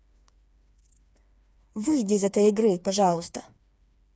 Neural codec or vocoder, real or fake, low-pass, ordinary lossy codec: codec, 16 kHz, 4 kbps, FreqCodec, smaller model; fake; none; none